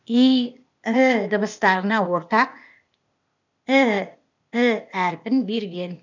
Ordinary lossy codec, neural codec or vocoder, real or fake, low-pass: none; codec, 16 kHz, 0.8 kbps, ZipCodec; fake; 7.2 kHz